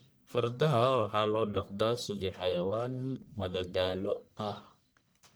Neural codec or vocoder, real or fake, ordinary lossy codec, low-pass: codec, 44.1 kHz, 1.7 kbps, Pupu-Codec; fake; none; none